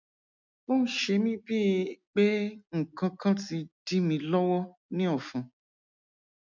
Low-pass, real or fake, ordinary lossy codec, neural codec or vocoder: 7.2 kHz; real; MP3, 64 kbps; none